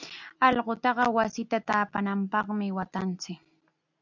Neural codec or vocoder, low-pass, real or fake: none; 7.2 kHz; real